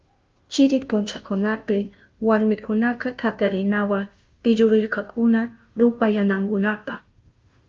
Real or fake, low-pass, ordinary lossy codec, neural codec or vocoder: fake; 7.2 kHz; Opus, 24 kbps; codec, 16 kHz, 0.5 kbps, FunCodec, trained on Chinese and English, 25 frames a second